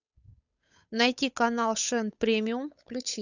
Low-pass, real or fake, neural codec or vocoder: 7.2 kHz; fake; codec, 16 kHz, 8 kbps, FunCodec, trained on Chinese and English, 25 frames a second